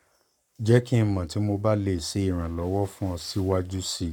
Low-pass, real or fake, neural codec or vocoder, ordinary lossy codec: 19.8 kHz; fake; codec, 44.1 kHz, 7.8 kbps, Pupu-Codec; none